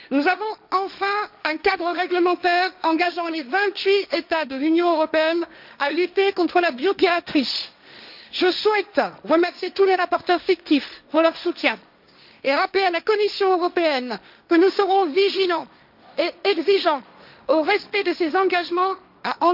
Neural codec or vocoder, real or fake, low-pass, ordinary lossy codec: codec, 16 kHz, 1.1 kbps, Voila-Tokenizer; fake; 5.4 kHz; none